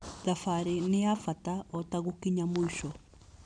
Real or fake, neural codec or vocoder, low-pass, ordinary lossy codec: fake; vocoder, 44.1 kHz, 128 mel bands every 256 samples, BigVGAN v2; 9.9 kHz; none